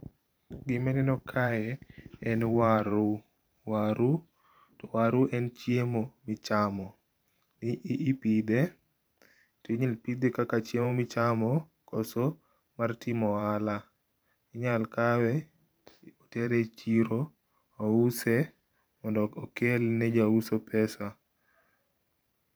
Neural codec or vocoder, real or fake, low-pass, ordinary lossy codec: vocoder, 44.1 kHz, 128 mel bands every 512 samples, BigVGAN v2; fake; none; none